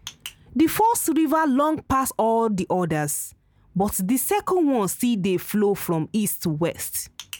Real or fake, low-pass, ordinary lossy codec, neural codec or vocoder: real; none; none; none